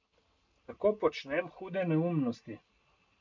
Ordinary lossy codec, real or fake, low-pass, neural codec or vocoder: none; real; 7.2 kHz; none